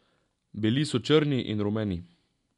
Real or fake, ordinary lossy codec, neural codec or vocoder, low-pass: real; none; none; 10.8 kHz